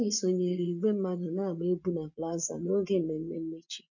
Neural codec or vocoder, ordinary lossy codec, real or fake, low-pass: vocoder, 24 kHz, 100 mel bands, Vocos; none; fake; 7.2 kHz